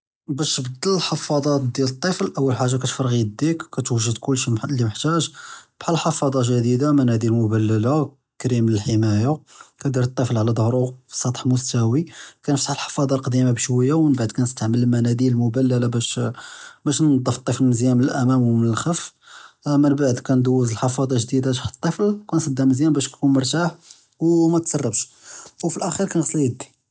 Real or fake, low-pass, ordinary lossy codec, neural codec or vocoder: real; none; none; none